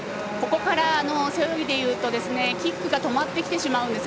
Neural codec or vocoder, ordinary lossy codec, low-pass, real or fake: none; none; none; real